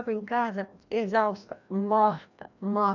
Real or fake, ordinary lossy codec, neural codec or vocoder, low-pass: fake; none; codec, 16 kHz, 1 kbps, FreqCodec, larger model; 7.2 kHz